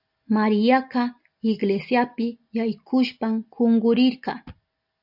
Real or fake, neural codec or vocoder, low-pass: real; none; 5.4 kHz